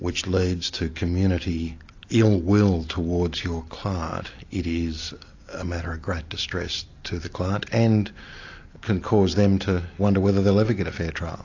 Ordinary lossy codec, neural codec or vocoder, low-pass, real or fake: AAC, 48 kbps; none; 7.2 kHz; real